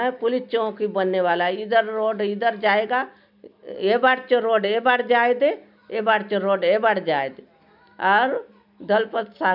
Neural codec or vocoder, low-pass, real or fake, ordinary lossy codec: none; 5.4 kHz; real; none